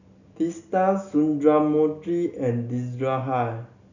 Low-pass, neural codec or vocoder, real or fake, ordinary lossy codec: 7.2 kHz; none; real; none